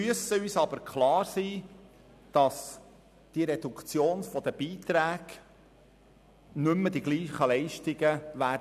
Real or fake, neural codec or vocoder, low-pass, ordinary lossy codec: real; none; 14.4 kHz; none